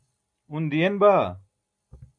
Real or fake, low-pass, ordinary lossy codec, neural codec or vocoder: real; 9.9 kHz; AAC, 64 kbps; none